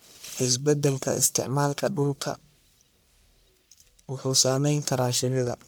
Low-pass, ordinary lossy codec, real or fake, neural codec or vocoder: none; none; fake; codec, 44.1 kHz, 1.7 kbps, Pupu-Codec